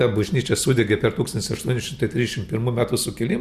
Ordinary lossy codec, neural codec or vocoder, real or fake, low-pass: Opus, 64 kbps; none; real; 14.4 kHz